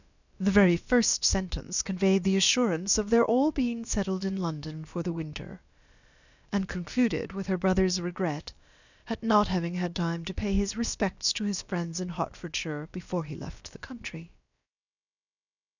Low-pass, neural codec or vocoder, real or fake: 7.2 kHz; codec, 16 kHz, about 1 kbps, DyCAST, with the encoder's durations; fake